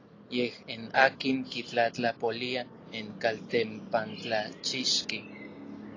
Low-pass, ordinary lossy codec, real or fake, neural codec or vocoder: 7.2 kHz; AAC, 32 kbps; real; none